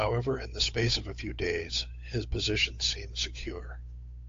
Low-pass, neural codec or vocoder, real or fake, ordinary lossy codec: 7.2 kHz; codec, 16 kHz, 8 kbps, FunCodec, trained on Chinese and English, 25 frames a second; fake; AAC, 48 kbps